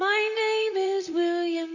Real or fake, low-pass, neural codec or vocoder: fake; 7.2 kHz; vocoder, 44.1 kHz, 128 mel bands, Pupu-Vocoder